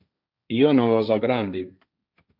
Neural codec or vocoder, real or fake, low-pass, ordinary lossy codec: codec, 16 kHz, 1.1 kbps, Voila-Tokenizer; fake; 5.4 kHz; MP3, 48 kbps